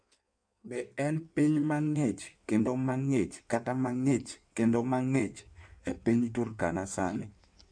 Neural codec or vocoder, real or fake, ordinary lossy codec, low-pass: codec, 16 kHz in and 24 kHz out, 1.1 kbps, FireRedTTS-2 codec; fake; MP3, 64 kbps; 9.9 kHz